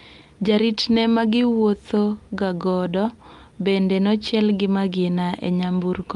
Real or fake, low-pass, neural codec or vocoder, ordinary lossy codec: real; 10.8 kHz; none; Opus, 32 kbps